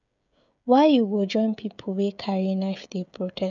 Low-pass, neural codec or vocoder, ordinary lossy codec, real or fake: 7.2 kHz; codec, 16 kHz, 16 kbps, FreqCodec, smaller model; none; fake